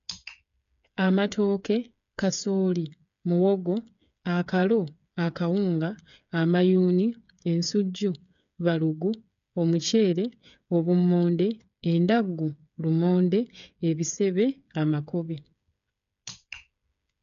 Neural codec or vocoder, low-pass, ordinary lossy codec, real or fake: codec, 16 kHz, 8 kbps, FreqCodec, smaller model; 7.2 kHz; none; fake